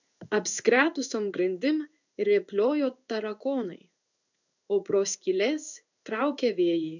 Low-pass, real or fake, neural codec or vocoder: 7.2 kHz; fake; codec, 16 kHz in and 24 kHz out, 1 kbps, XY-Tokenizer